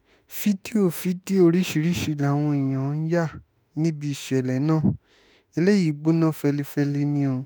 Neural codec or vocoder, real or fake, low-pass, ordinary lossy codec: autoencoder, 48 kHz, 32 numbers a frame, DAC-VAE, trained on Japanese speech; fake; none; none